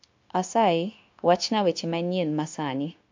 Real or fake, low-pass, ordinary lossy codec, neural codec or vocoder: fake; 7.2 kHz; MP3, 48 kbps; codec, 16 kHz, 0.9 kbps, LongCat-Audio-Codec